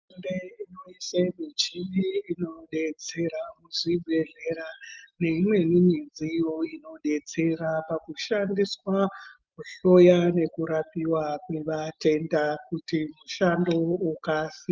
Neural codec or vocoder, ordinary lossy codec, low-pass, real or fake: none; Opus, 24 kbps; 7.2 kHz; real